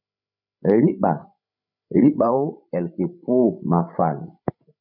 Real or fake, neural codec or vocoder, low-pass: fake; codec, 16 kHz, 16 kbps, FreqCodec, larger model; 5.4 kHz